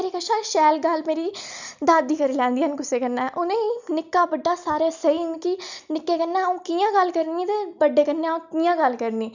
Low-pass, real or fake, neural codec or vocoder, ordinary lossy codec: 7.2 kHz; real; none; none